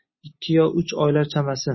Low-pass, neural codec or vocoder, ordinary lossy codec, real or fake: 7.2 kHz; none; MP3, 24 kbps; real